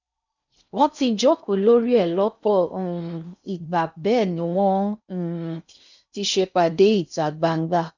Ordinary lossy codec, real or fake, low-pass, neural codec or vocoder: none; fake; 7.2 kHz; codec, 16 kHz in and 24 kHz out, 0.6 kbps, FocalCodec, streaming, 4096 codes